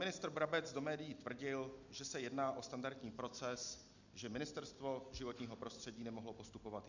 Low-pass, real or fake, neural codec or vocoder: 7.2 kHz; real; none